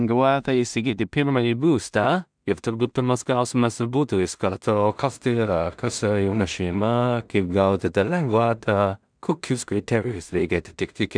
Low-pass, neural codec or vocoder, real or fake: 9.9 kHz; codec, 16 kHz in and 24 kHz out, 0.4 kbps, LongCat-Audio-Codec, two codebook decoder; fake